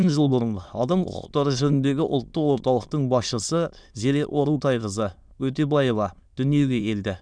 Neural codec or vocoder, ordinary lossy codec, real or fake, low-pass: autoencoder, 22.05 kHz, a latent of 192 numbers a frame, VITS, trained on many speakers; none; fake; 9.9 kHz